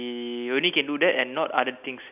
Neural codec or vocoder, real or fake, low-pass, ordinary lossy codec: none; real; 3.6 kHz; none